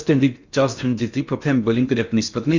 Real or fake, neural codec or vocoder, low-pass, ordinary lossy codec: fake; codec, 16 kHz in and 24 kHz out, 0.6 kbps, FocalCodec, streaming, 4096 codes; 7.2 kHz; Opus, 64 kbps